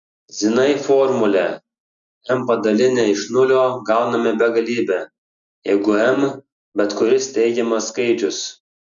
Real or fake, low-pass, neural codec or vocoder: real; 7.2 kHz; none